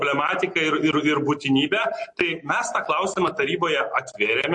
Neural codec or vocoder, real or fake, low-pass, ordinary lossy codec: none; real; 10.8 kHz; MP3, 48 kbps